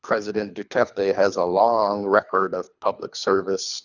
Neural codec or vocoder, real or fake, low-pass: codec, 24 kHz, 3 kbps, HILCodec; fake; 7.2 kHz